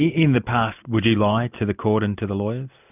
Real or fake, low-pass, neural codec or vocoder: real; 3.6 kHz; none